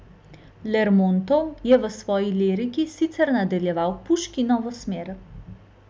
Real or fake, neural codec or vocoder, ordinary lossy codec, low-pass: real; none; none; none